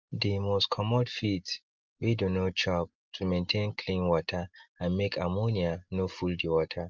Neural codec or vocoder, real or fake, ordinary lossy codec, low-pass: none; real; Opus, 24 kbps; 7.2 kHz